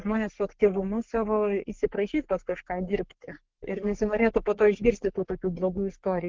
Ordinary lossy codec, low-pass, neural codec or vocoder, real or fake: Opus, 16 kbps; 7.2 kHz; codec, 44.1 kHz, 3.4 kbps, Pupu-Codec; fake